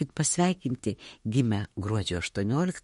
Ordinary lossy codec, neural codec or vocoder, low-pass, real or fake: MP3, 48 kbps; autoencoder, 48 kHz, 32 numbers a frame, DAC-VAE, trained on Japanese speech; 19.8 kHz; fake